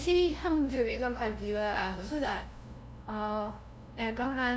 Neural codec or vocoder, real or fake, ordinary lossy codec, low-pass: codec, 16 kHz, 0.5 kbps, FunCodec, trained on LibriTTS, 25 frames a second; fake; none; none